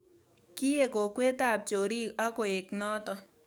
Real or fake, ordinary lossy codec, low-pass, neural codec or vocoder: fake; none; none; codec, 44.1 kHz, 7.8 kbps, DAC